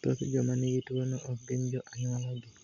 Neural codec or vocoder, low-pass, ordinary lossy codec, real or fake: none; 7.2 kHz; none; real